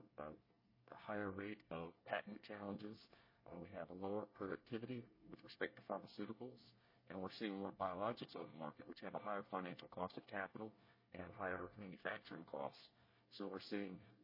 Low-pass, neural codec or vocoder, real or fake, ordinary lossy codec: 5.4 kHz; codec, 24 kHz, 1 kbps, SNAC; fake; MP3, 24 kbps